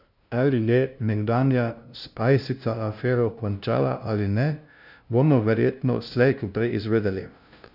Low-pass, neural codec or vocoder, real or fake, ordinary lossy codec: 5.4 kHz; codec, 16 kHz, 0.5 kbps, FunCodec, trained on LibriTTS, 25 frames a second; fake; none